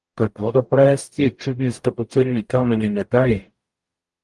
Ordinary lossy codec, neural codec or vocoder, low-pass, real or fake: Opus, 24 kbps; codec, 44.1 kHz, 0.9 kbps, DAC; 10.8 kHz; fake